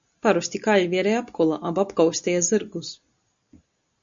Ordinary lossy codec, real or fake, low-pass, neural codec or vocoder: Opus, 64 kbps; real; 7.2 kHz; none